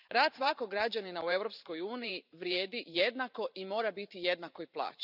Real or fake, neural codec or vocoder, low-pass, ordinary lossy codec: fake; vocoder, 44.1 kHz, 128 mel bands every 512 samples, BigVGAN v2; 5.4 kHz; none